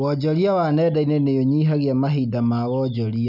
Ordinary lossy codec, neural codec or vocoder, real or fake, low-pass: none; none; real; 5.4 kHz